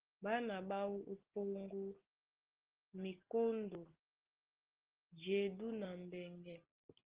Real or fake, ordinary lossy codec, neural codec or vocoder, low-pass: real; Opus, 16 kbps; none; 3.6 kHz